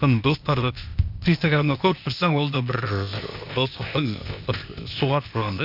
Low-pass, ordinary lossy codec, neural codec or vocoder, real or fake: 5.4 kHz; none; codec, 16 kHz, 0.8 kbps, ZipCodec; fake